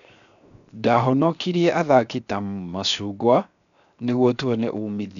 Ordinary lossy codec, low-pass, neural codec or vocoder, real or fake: none; 7.2 kHz; codec, 16 kHz, 0.7 kbps, FocalCodec; fake